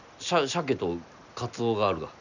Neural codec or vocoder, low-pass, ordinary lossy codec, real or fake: none; 7.2 kHz; none; real